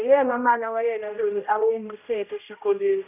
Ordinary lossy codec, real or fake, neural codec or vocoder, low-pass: none; fake; codec, 16 kHz, 0.5 kbps, X-Codec, HuBERT features, trained on general audio; 3.6 kHz